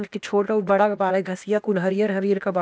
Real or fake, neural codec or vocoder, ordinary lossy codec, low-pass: fake; codec, 16 kHz, 0.8 kbps, ZipCodec; none; none